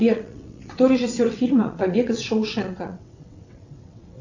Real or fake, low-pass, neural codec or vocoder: fake; 7.2 kHz; vocoder, 22.05 kHz, 80 mel bands, WaveNeXt